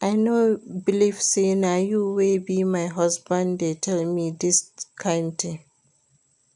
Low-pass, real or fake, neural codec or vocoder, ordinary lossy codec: 10.8 kHz; real; none; none